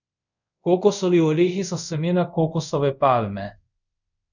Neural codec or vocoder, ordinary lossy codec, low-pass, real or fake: codec, 24 kHz, 0.5 kbps, DualCodec; none; 7.2 kHz; fake